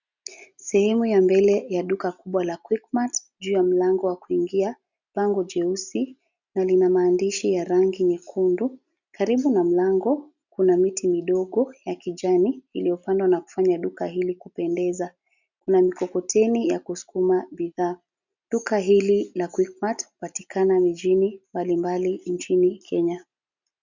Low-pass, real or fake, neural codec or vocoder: 7.2 kHz; real; none